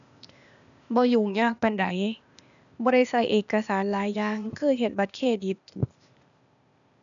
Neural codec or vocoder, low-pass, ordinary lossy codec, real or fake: codec, 16 kHz, 0.8 kbps, ZipCodec; 7.2 kHz; none; fake